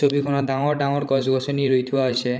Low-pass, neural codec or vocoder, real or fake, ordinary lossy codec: none; codec, 16 kHz, 16 kbps, FreqCodec, larger model; fake; none